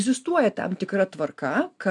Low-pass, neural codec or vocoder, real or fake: 10.8 kHz; none; real